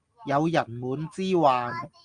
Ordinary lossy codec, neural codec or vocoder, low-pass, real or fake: Opus, 32 kbps; none; 9.9 kHz; real